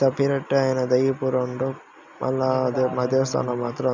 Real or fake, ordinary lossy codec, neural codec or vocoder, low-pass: real; none; none; 7.2 kHz